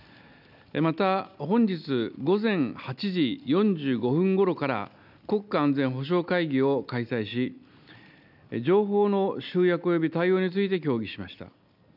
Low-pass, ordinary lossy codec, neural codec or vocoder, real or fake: 5.4 kHz; none; none; real